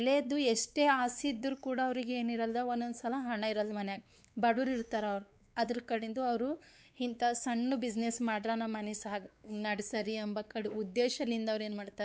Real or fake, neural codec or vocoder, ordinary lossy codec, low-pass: fake; codec, 16 kHz, 4 kbps, X-Codec, WavLM features, trained on Multilingual LibriSpeech; none; none